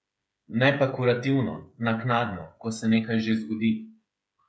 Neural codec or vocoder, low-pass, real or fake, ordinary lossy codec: codec, 16 kHz, 8 kbps, FreqCodec, smaller model; none; fake; none